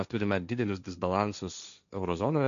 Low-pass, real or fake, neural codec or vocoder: 7.2 kHz; fake; codec, 16 kHz, 1.1 kbps, Voila-Tokenizer